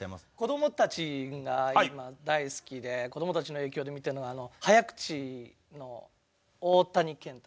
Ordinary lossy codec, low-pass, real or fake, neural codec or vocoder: none; none; real; none